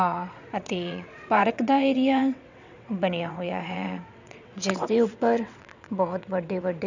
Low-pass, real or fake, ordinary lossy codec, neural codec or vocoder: 7.2 kHz; fake; none; vocoder, 22.05 kHz, 80 mel bands, WaveNeXt